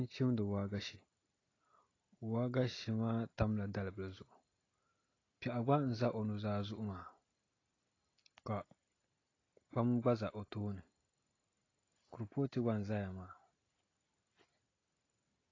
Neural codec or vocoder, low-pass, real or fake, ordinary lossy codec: none; 7.2 kHz; real; AAC, 32 kbps